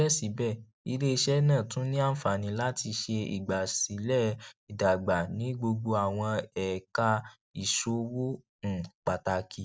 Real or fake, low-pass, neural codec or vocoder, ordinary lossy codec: real; none; none; none